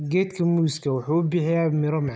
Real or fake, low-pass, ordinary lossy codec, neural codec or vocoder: real; none; none; none